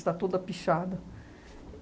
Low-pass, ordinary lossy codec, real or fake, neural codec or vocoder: none; none; real; none